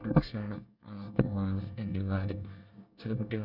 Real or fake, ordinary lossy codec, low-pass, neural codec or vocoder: fake; none; 5.4 kHz; codec, 24 kHz, 1 kbps, SNAC